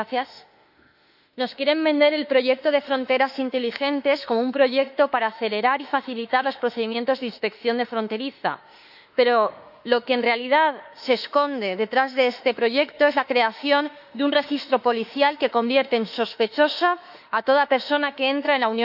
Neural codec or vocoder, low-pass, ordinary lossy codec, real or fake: autoencoder, 48 kHz, 32 numbers a frame, DAC-VAE, trained on Japanese speech; 5.4 kHz; none; fake